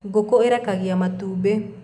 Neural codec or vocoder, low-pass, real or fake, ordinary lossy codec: none; none; real; none